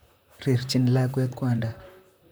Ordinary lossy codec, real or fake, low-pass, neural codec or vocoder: none; fake; none; vocoder, 44.1 kHz, 128 mel bands, Pupu-Vocoder